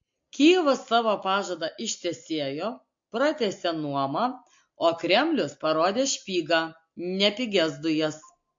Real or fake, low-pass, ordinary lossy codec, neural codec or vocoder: real; 7.2 kHz; MP3, 48 kbps; none